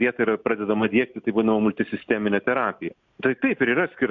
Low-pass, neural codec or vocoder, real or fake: 7.2 kHz; none; real